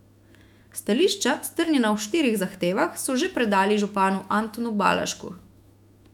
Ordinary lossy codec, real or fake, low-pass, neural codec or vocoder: none; fake; 19.8 kHz; autoencoder, 48 kHz, 128 numbers a frame, DAC-VAE, trained on Japanese speech